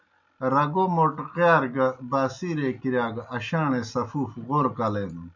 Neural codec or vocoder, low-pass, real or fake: none; 7.2 kHz; real